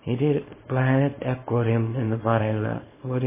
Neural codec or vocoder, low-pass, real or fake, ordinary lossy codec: codec, 24 kHz, 0.9 kbps, WavTokenizer, small release; 3.6 kHz; fake; MP3, 16 kbps